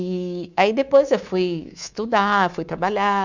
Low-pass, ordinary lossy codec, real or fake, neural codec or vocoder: 7.2 kHz; none; fake; codec, 24 kHz, 3.1 kbps, DualCodec